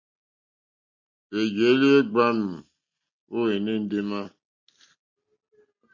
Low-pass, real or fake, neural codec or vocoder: 7.2 kHz; real; none